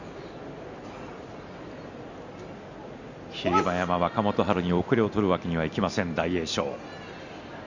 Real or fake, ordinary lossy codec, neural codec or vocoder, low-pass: real; none; none; 7.2 kHz